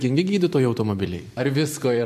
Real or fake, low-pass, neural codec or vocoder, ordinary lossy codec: fake; 14.4 kHz; vocoder, 44.1 kHz, 128 mel bands every 512 samples, BigVGAN v2; MP3, 64 kbps